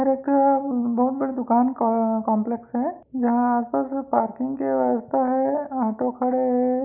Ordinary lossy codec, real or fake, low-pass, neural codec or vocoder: none; real; 3.6 kHz; none